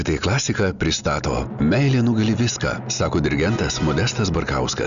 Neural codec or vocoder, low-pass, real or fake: none; 7.2 kHz; real